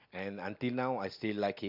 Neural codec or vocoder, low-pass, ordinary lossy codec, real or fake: none; 5.4 kHz; MP3, 48 kbps; real